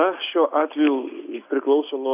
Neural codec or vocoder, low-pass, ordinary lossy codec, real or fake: none; 3.6 kHz; AAC, 24 kbps; real